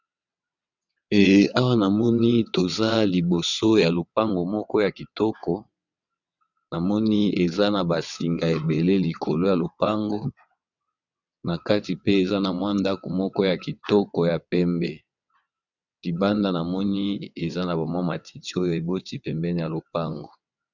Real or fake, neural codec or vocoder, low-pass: fake; vocoder, 22.05 kHz, 80 mel bands, WaveNeXt; 7.2 kHz